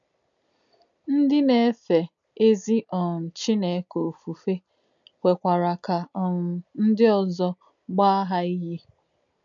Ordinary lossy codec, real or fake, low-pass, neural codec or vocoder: MP3, 96 kbps; real; 7.2 kHz; none